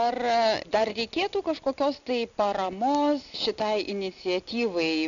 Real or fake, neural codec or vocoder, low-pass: real; none; 7.2 kHz